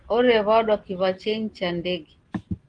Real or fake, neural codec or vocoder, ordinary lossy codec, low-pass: real; none; Opus, 16 kbps; 9.9 kHz